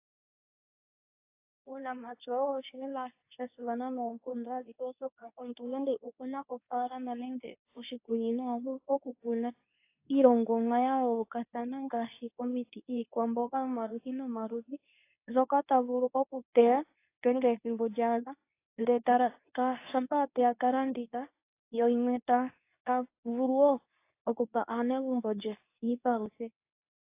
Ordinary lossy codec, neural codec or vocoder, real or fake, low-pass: AAC, 24 kbps; codec, 24 kHz, 0.9 kbps, WavTokenizer, medium speech release version 2; fake; 3.6 kHz